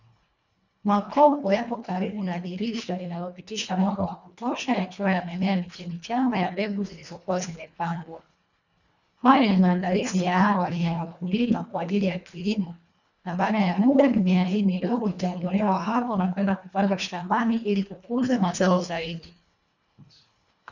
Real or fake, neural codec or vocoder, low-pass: fake; codec, 24 kHz, 1.5 kbps, HILCodec; 7.2 kHz